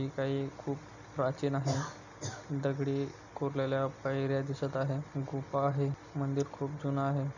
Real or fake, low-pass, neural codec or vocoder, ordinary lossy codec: real; 7.2 kHz; none; none